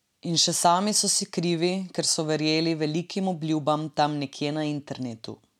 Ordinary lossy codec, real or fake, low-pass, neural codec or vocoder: none; real; 19.8 kHz; none